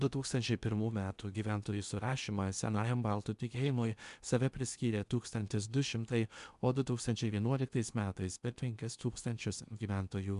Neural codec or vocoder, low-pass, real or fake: codec, 16 kHz in and 24 kHz out, 0.8 kbps, FocalCodec, streaming, 65536 codes; 10.8 kHz; fake